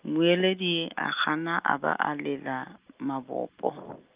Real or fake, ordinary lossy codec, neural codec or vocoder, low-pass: real; Opus, 24 kbps; none; 3.6 kHz